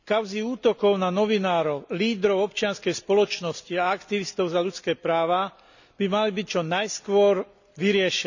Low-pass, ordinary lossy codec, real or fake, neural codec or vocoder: 7.2 kHz; none; real; none